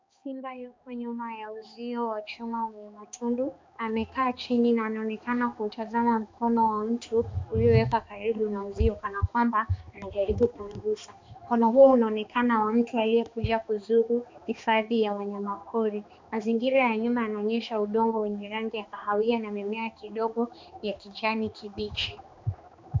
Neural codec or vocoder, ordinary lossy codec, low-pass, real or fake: codec, 16 kHz, 2 kbps, X-Codec, HuBERT features, trained on balanced general audio; MP3, 64 kbps; 7.2 kHz; fake